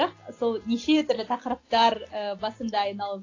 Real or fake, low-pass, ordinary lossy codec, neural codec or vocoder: real; 7.2 kHz; AAC, 32 kbps; none